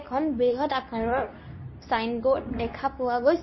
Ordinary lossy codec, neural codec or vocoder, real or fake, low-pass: MP3, 24 kbps; codec, 24 kHz, 0.9 kbps, WavTokenizer, medium speech release version 2; fake; 7.2 kHz